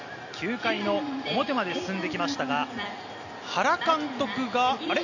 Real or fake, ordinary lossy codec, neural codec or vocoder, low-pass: real; none; none; 7.2 kHz